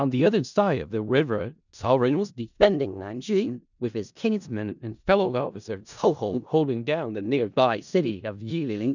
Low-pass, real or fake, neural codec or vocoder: 7.2 kHz; fake; codec, 16 kHz in and 24 kHz out, 0.4 kbps, LongCat-Audio-Codec, four codebook decoder